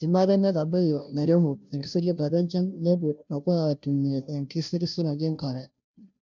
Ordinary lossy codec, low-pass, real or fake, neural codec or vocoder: none; 7.2 kHz; fake; codec, 16 kHz, 0.5 kbps, FunCodec, trained on Chinese and English, 25 frames a second